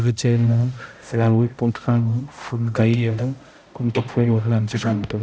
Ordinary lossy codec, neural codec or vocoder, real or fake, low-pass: none; codec, 16 kHz, 0.5 kbps, X-Codec, HuBERT features, trained on balanced general audio; fake; none